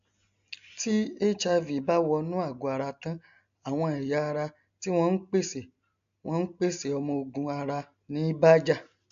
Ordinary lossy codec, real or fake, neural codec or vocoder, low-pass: AAC, 96 kbps; real; none; 7.2 kHz